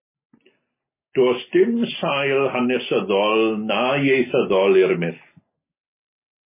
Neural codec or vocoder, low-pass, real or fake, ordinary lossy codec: none; 3.6 kHz; real; MP3, 16 kbps